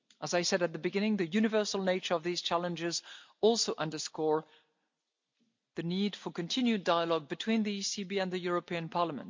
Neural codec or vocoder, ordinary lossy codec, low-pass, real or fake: none; MP3, 64 kbps; 7.2 kHz; real